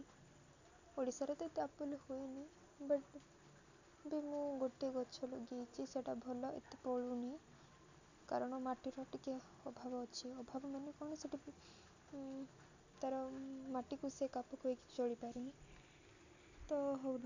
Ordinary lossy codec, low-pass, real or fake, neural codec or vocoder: none; 7.2 kHz; real; none